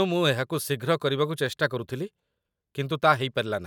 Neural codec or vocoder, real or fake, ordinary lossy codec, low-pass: none; real; none; 19.8 kHz